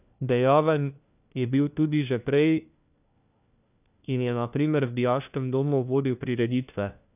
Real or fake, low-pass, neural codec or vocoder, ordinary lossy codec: fake; 3.6 kHz; codec, 16 kHz, 1 kbps, FunCodec, trained on LibriTTS, 50 frames a second; none